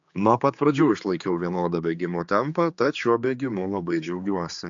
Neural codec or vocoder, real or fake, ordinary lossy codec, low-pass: codec, 16 kHz, 4 kbps, X-Codec, HuBERT features, trained on general audio; fake; AAC, 64 kbps; 7.2 kHz